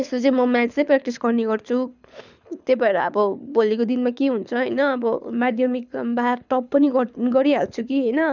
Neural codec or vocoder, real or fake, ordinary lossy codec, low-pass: codec, 24 kHz, 6 kbps, HILCodec; fake; none; 7.2 kHz